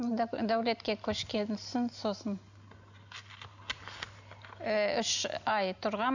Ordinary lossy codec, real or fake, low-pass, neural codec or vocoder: none; real; 7.2 kHz; none